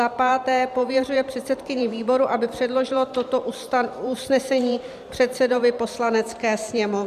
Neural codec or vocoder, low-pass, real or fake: vocoder, 44.1 kHz, 128 mel bands every 512 samples, BigVGAN v2; 14.4 kHz; fake